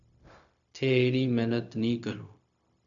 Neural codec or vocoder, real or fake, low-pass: codec, 16 kHz, 0.4 kbps, LongCat-Audio-Codec; fake; 7.2 kHz